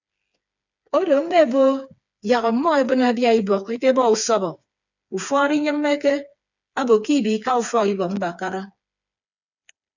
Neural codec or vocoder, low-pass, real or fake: codec, 16 kHz, 4 kbps, FreqCodec, smaller model; 7.2 kHz; fake